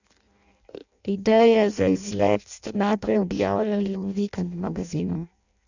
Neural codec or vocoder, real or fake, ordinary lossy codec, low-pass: codec, 16 kHz in and 24 kHz out, 0.6 kbps, FireRedTTS-2 codec; fake; none; 7.2 kHz